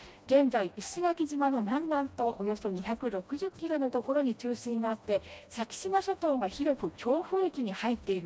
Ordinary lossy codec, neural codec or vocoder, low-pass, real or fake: none; codec, 16 kHz, 1 kbps, FreqCodec, smaller model; none; fake